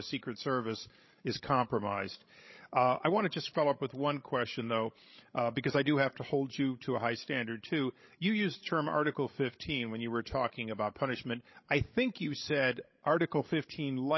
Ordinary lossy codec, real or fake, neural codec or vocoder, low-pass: MP3, 24 kbps; fake; codec, 16 kHz, 16 kbps, FreqCodec, larger model; 7.2 kHz